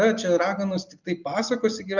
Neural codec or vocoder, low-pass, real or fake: none; 7.2 kHz; real